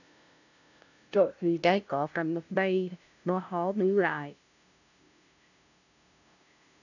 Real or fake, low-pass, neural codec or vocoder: fake; 7.2 kHz; codec, 16 kHz, 0.5 kbps, FunCodec, trained on LibriTTS, 25 frames a second